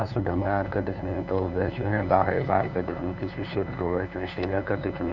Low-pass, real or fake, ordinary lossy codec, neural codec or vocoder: 7.2 kHz; fake; Opus, 64 kbps; codec, 16 kHz, 2 kbps, FunCodec, trained on LibriTTS, 25 frames a second